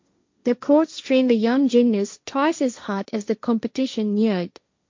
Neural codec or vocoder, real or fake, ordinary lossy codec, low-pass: codec, 16 kHz, 1.1 kbps, Voila-Tokenizer; fake; MP3, 48 kbps; 7.2 kHz